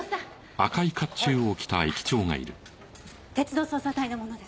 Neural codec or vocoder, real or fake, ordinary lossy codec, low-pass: none; real; none; none